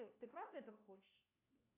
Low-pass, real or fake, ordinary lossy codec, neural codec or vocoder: 3.6 kHz; fake; AAC, 32 kbps; codec, 16 kHz, 1 kbps, FunCodec, trained on LibriTTS, 50 frames a second